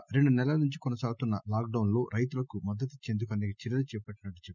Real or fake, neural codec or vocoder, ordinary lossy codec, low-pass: real; none; none; 7.2 kHz